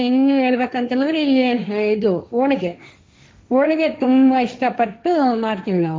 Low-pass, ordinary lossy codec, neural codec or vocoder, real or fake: none; none; codec, 16 kHz, 1.1 kbps, Voila-Tokenizer; fake